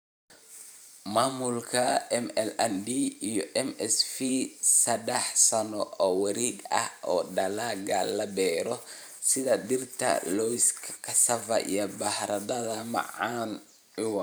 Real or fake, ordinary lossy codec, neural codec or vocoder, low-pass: fake; none; vocoder, 44.1 kHz, 128 mel bands every 512 samples, BigVGAN v2; none